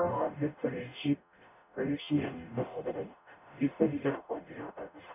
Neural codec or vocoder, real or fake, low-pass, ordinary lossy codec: codec, 44.1 kHz, 0.9 kbps, DAC; fake; 3.6 kHz; none